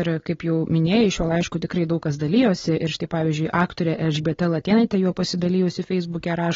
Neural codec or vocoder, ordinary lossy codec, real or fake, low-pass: none; AAC, 24 kbps; real; 7.2 kHz